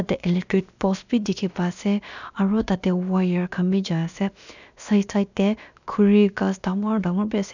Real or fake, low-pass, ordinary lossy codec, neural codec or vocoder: fake; 7.2 kHz; none; codec, 16 kHz, about 1 kbps, DyCAST, with the encoder's durations